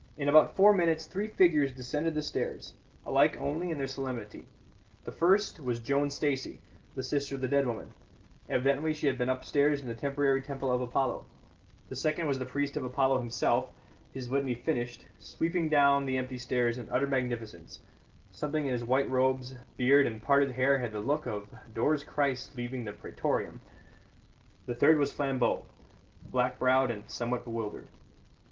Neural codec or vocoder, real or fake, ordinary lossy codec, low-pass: none; real; Opus, 16 kbps; 7.2 kHz